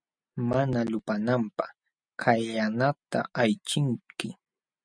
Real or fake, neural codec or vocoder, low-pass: real; none; 9.9 kHz